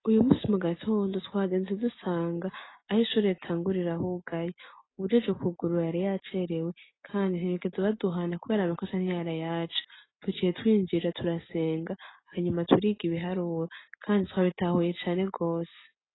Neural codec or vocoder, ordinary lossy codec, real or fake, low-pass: none; AAC, 16 kbps; real; 7.2 kHz